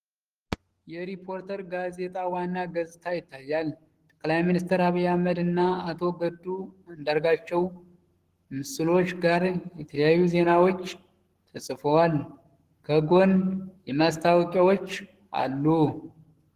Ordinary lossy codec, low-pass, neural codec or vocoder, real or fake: Opus, 16 kbps; 14.4 kHz; codec, 44.1 kHz, 7.8 kbps, Pupu-Codec; fake